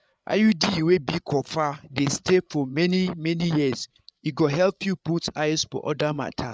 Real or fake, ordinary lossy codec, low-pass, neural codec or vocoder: fake; none; none; codec, 16 kHz, 8 kbps, FreqCodec, larger model